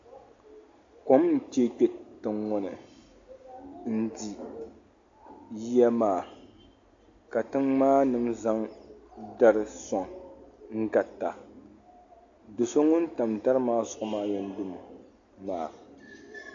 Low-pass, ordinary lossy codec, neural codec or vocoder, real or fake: 7.2 kHz; AAC, 48 kbps; none; real